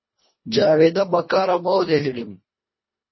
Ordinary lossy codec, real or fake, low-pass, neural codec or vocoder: MP3, 24 kbps; fake; 7.2 kHz; codec, 24 kHz, 1.5 kbps, HILCodec